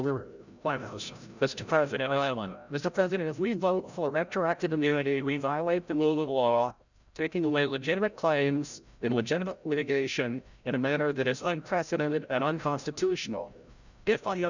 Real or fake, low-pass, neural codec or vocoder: fake; 7.2 kHz; codec, 16 kHz, 0.5 kbps, FreqCodec, larger model